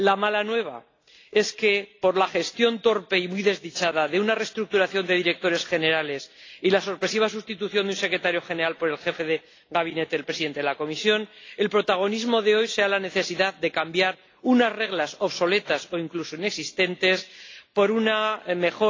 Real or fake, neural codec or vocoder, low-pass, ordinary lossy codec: real; none; 7.2 kHz; AAC, 32 kbps